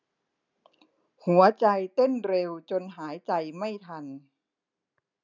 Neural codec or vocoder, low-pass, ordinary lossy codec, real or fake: none; 7.2 kHz; none; real